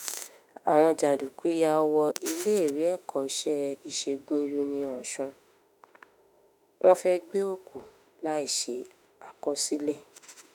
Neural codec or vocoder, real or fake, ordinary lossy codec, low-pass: autoencoder, 48 kHz, 32 numbers a frame, DAC-VAE, trained on Japanese speech; fake; none; none